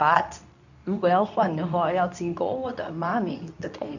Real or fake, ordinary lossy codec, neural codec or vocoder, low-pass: fake; none; codec, 24 kHz, 0.9 kbps, WavTokenizer, medium speech release version 2; 7.2 kHz